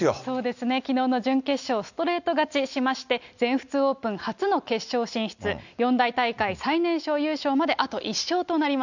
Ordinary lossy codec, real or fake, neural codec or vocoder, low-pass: none; real; none; 7.2 kHz